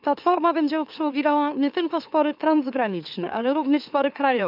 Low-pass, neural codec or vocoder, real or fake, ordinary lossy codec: 5.4 kHz; autoencoder, 44.1 kHz, a latent of 192 numbers a frame, MeloTTS; fake; none